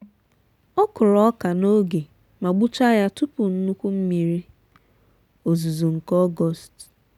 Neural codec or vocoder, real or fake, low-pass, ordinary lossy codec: none; real; 19.8 kHz; none